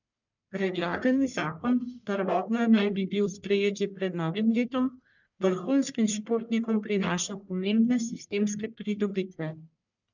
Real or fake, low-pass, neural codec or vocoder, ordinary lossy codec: fake; 7.2 kHz; codec, 44.1 kHz, 1.7 kbps, Pupu-Codec; none